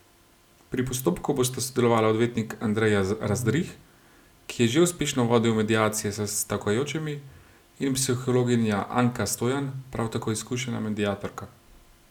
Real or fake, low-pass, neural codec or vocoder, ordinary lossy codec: real; 19.8 kHz; none; none